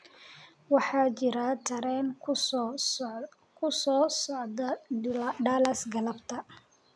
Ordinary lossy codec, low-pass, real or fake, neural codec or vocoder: none; none; real; none